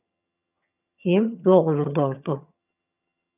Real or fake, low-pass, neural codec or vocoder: fake; 3.6 kHz; vocoder, 22.05 kHz, 80 mel bands, HiFi-GAN